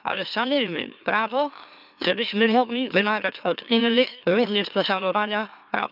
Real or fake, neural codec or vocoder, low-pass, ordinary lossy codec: fake; autoencoder, 44.1 kHz, a latent of 192 numbers a frame, MeloTTS; 5.4 kHz; none